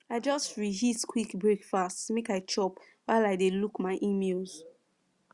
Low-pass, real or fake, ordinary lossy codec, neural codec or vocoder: 10.8 kHz; real; Opus, 64 kbps; none